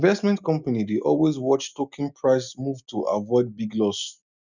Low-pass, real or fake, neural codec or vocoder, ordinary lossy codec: 7.2 kHz; real; none; none